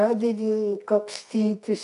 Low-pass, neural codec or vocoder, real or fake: 10.8 kHz; codec, 24 kHz, 0.9 kbps, WavTokenizer, medium music audio release; fake